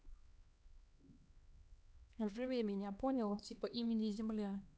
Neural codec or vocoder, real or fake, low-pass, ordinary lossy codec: codec, 16 kHz, 2 kbps, X-Codec, HuBERT features, trained on LibriSpeech; fake; none; none